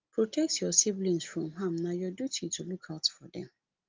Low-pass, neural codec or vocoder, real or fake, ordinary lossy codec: 7.2 kHz; none; real; Opus, 24 kbps